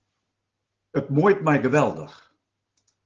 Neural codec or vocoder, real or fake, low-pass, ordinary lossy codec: none; real; 7.2 kHz; Opus, 16 kbps